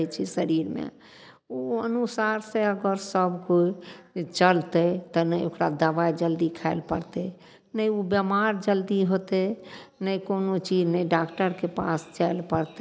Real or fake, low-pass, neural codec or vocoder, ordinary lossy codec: real; none; none; none